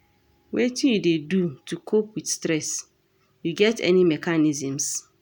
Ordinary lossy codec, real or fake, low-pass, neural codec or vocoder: none; real; 19.8 kHz; none